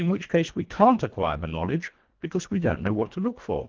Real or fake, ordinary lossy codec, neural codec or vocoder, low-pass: fake; Opus, 16 kbps; codec, 24 kHz, 1.5 kbps, HILCodec; 7.2 kHz